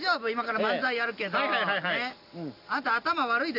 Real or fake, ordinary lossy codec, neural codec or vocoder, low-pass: real; none; none; 5.4 kHz